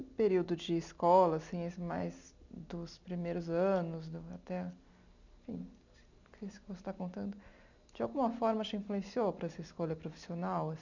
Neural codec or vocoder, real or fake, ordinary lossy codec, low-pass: none; real; none; 7.2 kHz